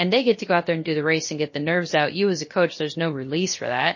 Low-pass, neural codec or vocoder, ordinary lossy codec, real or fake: 7.2 kHz; codec, 16 kHz, 0.7 kbps, FocalCodec; MP3, 32 kbps; fake